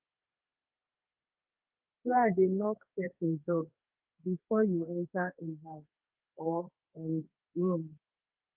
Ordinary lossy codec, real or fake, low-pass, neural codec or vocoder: Opus, 24 kbps; fake; 3.6 kHz; codec, 32 kHz, 1.9 kbps, SNAC